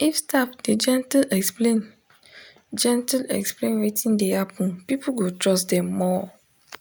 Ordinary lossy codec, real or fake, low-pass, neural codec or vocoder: none; real; none; none